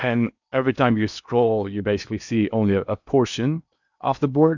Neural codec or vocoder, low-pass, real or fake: codec, 16 kHz in and 24 kHz out, 0.8 kbps, FocalCodec, streaming, 65536 codes; 7.2 kHz; fake